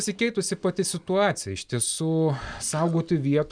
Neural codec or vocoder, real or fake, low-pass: none; real; 9.9 kHz